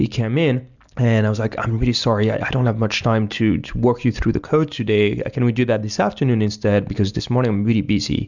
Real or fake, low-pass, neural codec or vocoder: real; 7.2 kHz; none